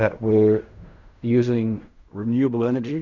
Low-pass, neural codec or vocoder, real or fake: 7.2 kHz; codec, 16 kHz in and 24 kHz out, 0.4 kbps, LongCat-Audio-Codec, fine tuned four codebook decoder; fake